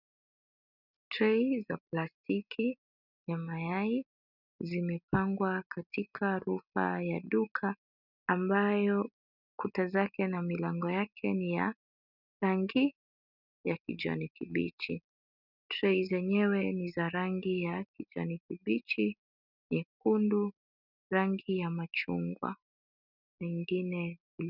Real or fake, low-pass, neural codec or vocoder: real; 5.4 kHz; none